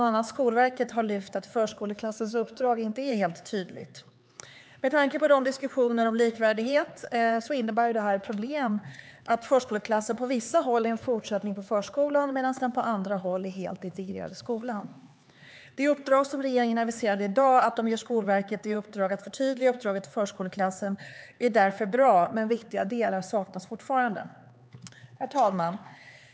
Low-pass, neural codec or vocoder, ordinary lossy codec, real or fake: none; codec, 16 kHz, 4 kbps, X-Codec, HuBERT features, trained on LibriSpeech; none; fake